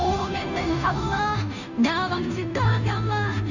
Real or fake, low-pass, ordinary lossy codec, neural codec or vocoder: fake; 7.2 kHz; none; codec, 16 kHz, 0.5 kbps, FunCodec, trained on Chinese and English, 25 frames a second